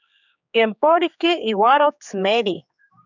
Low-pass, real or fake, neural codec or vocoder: 7.2 kHz; fake; codec, 16 kHz, 4 kbps, X-Codec, HuBERT features, trained on general audio